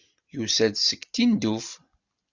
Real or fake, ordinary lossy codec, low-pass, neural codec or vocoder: real; Opus, 64 kbps; 7.2 kHz; none